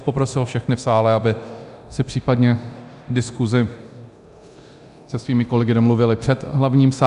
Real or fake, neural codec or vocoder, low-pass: fake; codec, 24 kHz, 0.9 kbps, DualCodec; 10.8 kHz